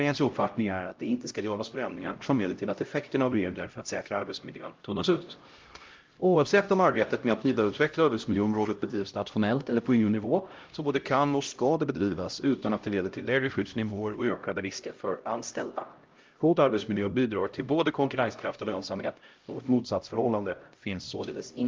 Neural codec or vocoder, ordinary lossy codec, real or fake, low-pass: codec, 16 kHz, 0.5 kbps, X-Codec, HuBERT features, trained on LibriSpeech; Opus, 32 kbps; fake; 7.2 kHz